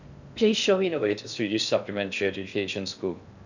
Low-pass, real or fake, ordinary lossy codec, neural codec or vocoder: 7.2 kHz; fake; none; codec, 16 kHz in and 24 kHz out, 0.6 kbps, FocalCodec, streaming, 2048 codes